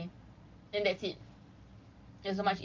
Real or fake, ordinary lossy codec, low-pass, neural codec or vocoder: real; Opus, 32 kbps; 7.2 kHz; none